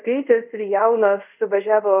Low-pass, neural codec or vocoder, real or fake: 3.6 kHz; codec, 24 kHz, 0.5 kbps, DualCodec; fake